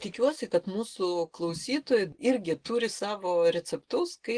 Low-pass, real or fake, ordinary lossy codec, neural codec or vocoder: 9.9 kHz; real; Opus, 16 kbps; none